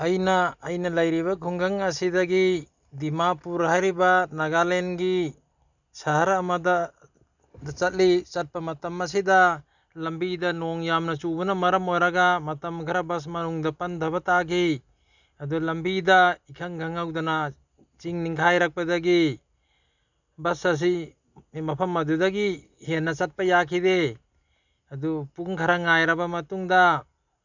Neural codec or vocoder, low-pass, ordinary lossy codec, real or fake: none; 7.2 kHz; none; real